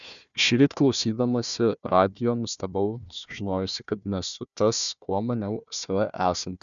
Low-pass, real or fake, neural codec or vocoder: 7.2 kHz; fake; codec, 16 kHz, 1 kbps, FunCodec, trained on Chinese and English, 50 frames a second